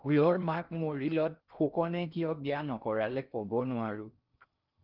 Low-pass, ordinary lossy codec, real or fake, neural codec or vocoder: 5.4 kHz; Opus, 24 kbps; fake; codec, 16 kHz in and 24 kHz out, 0.6 kbps, FocalCodec, streaming, 2048 codes